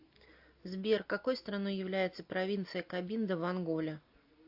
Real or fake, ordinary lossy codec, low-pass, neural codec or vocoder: real; AAC, 48 kbps; 5.4 kHz; none